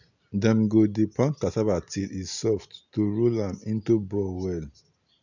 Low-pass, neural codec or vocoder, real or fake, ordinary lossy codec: 7.2 kHz; none; real; none